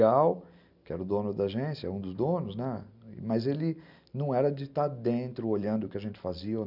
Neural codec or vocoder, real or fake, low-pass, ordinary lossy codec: none; real; 5.4 kHz; none